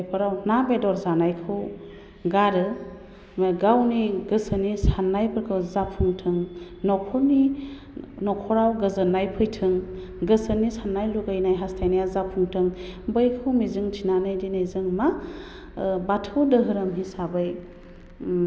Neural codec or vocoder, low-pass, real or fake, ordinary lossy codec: none; none; real; none